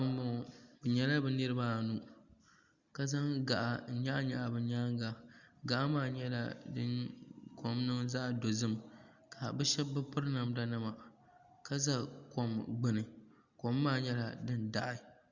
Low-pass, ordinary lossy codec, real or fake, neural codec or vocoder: 7.2 kHz; Opus, 64 kbps; real; none